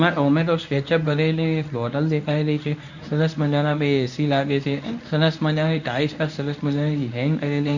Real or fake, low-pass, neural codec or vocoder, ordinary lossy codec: fake; 7.2 kHz; codec, 24 kHz, 0.9 kbps, WavTokenizer, medium speech release version 1; none